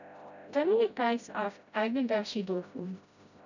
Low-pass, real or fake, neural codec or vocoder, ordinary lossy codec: 7.2 kHz; fake; codec, 16 kHz, 0.5 kbps, FreqCodec, smaller model; none